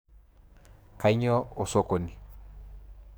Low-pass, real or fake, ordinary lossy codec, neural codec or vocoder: none; fake; none; codec, 44.1 kHz, 7.8 kbps, DAC